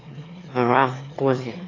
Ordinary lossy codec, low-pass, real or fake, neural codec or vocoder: AAC, 32 kbps; 7.2 kHz; fake; autoencoder, 22.05 kHz, a latent of 192 numbers a frame, VITS, trained on one speaker